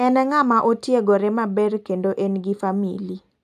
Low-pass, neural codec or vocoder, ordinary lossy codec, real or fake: 14.4 kHz; none; AAC, 96 kbps; real